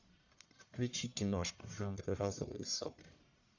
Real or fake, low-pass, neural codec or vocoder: fake; 7.2 kHz; codec, 44.1 kHz, 1.7 kbps, Pupu-Codec